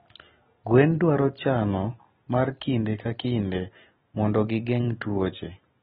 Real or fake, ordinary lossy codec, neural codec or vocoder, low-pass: real; AAC, 16 kbps; none; 19.8 kHz